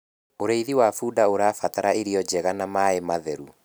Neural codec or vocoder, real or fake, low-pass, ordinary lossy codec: none; real; none; none